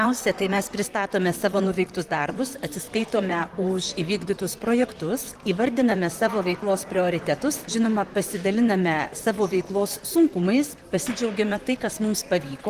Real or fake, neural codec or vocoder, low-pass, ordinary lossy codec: fake; vocoder, 44.1 kHz, 128 mel bands, Pupu-Vocoder; 14.4 kHz; Opus, 24 kbps